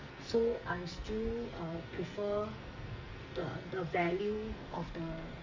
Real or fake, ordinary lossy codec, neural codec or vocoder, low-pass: fake; Opus, 32 kbps; codec, 44.1 kHz, 2.6 kbps, SNAC; 7.2 kHz